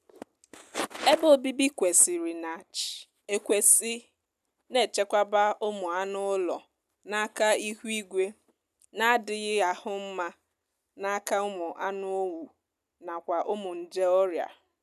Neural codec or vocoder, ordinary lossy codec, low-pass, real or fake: none; none; 14.4 kHz; real